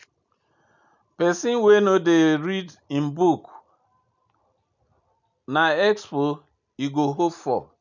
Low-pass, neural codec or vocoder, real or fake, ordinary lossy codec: 7.2 kHz; none; real; none